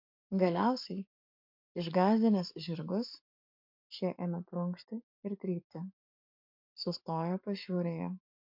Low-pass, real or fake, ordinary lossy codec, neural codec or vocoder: 5.4 kHz; fake; MP3, 48 kbps; codec, 44.1 kHz, 7.8 kbps, Pupu-Codec